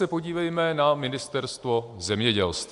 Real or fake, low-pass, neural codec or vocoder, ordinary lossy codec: real; 10.8 kHz; none; AAC, 64 kbps